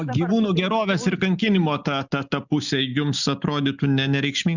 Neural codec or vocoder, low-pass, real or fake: none; 7.2 kHz; real